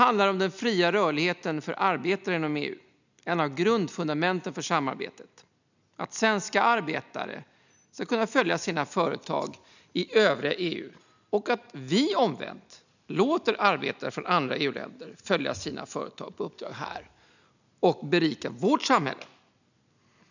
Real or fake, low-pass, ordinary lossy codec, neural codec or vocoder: real; 7.2 kHz; none; none